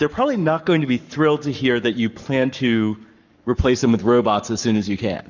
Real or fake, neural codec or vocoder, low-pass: fake; codec, 44.1 kHz, 7.8 kbps, Pupu-Codec; 7.2 kHz